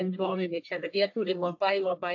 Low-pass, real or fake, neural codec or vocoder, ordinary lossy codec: 7.2 kHz; fake; codec, 44.1 kHz, 1.7 kbps, Pupu-Codec; AAC, 48 kbps